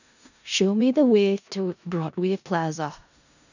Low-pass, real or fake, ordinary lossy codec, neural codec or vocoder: 7.2 kHz; fake; none; codec, 16 kHz in and 24 kHz out, 0.4 kbps, LongCat-Audio-Codec, four codebook decoder